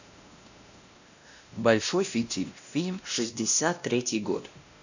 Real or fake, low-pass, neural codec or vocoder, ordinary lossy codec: fake; 7.2 kHz; codec, 16 kHz, 1 kbps, X-Codec, WavLM features, trained on Multilingual LibriSpeech; none